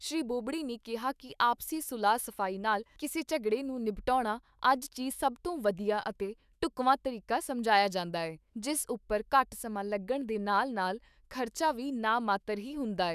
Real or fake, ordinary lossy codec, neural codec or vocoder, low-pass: fake; none; autoencoder, 48 kHz, 128 numbers a frame, DAC-VAE, trained on Japanese speech; 14.4 kHz